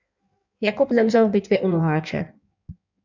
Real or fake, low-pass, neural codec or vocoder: fake; 7.2 kHz; codec, 16 kHz in and 24 kHz out, 1.1 kbps, FireRedTTS-2 codec